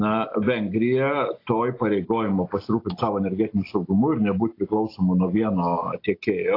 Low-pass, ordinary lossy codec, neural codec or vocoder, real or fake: 5.4 kHz; AAC, 32 kbps; none; real